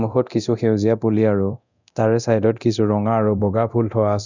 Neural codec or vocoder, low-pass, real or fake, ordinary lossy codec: codec, 24 kHz, 0.9 kbps, DualCodec; 7.2 kHz; fake; none